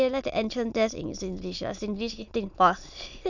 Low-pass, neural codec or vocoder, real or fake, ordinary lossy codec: 7.2 kHz; autoencoder, 22.05 kHz, a latent of 192 numbers a frame, VITS, trained on many speakers; fake; none